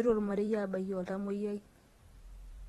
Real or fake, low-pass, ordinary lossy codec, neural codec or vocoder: fake; 19.8 kHz; AAC, 32 kbps; vocoder, 44.1 kHz, 128 mel bands every 512 samples, BigVGAN v2